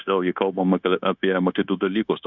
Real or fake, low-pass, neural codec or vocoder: fake; 7.2 kHz; codec, 16 kHz, 0.9 kbps, LongCat-Audio-Codec